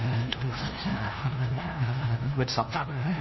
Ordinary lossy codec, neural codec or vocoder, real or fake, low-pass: MP3, 24 kbps; codec, 16 kHz, 0.5 kbps, FunCodec, trained on LibriTTS, 25 frames a second; fake; 7.2 kHz